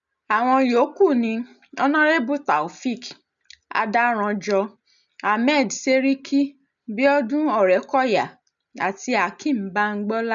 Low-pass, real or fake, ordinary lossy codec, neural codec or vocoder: 7.2 kHz; real; none; none